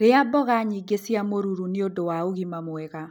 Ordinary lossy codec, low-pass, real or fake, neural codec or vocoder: none; none; real; none